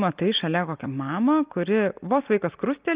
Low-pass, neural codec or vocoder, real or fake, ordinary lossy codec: 3.6 kHz; none; real; Opus, 24 kbps